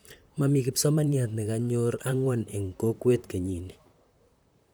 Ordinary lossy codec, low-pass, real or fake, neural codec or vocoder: none; none; fake; vocoder, 44.1 kHz, 128 mel bands, Pupu-Vocoder